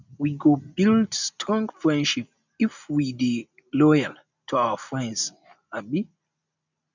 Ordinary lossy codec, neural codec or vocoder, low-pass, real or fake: none; none; 7.2 kHz; real